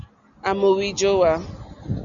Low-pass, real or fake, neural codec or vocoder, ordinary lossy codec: 7.2 kHz; real; none; Opus, 64 kbps